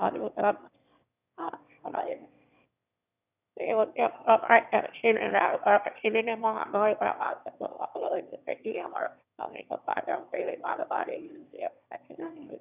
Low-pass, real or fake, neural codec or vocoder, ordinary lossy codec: 3.6 kHz; fake; autoencoder, 22.05 kHz, a latent of 192 numbers a frame, VITS, trained on one speaker; none